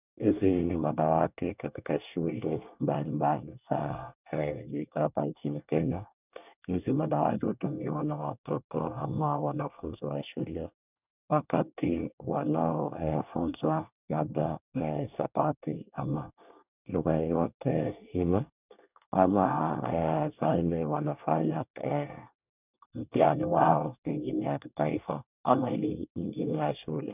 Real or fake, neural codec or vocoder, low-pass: fake; codec, 24 kHz, 1 kbps, SNAC; 3.6 kHz